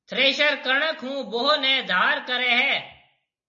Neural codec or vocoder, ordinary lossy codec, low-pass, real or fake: none; MP3, 32 kbps; 7.2 kHz; real